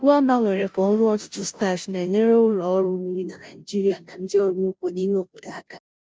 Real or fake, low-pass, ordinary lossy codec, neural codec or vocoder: fake; none; none; codec, 16 kHz, 0.5 kbps, FunCodec, trained on Chinese and English, 25 frames a second